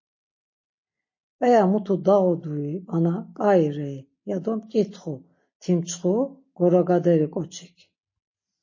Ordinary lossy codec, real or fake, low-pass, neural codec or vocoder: MP3, 32 kbps; real; 7.2 kHz; none